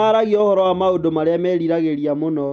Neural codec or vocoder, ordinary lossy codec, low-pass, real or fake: none; none; none; real